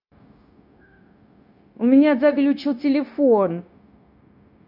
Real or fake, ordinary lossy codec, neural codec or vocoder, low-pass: fake; none; codec, 16 kHz, 0.9 kbps, LongCat-Audio-Codec; 5.4 kHz